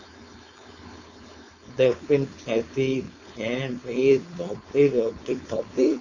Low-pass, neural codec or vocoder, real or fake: 7.2 kHz; codec, 16 kHz, 4.8 kbps, FACodec; fake